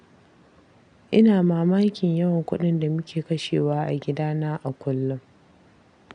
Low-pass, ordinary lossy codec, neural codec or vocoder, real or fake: 9.9 kHz; none; none; real